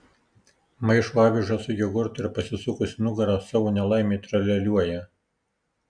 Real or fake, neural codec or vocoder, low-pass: real; none; 9.9 kHz